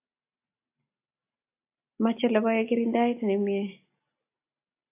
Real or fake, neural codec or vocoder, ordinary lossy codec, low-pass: real; none; AAC, 24 kbps; 3.6 kHz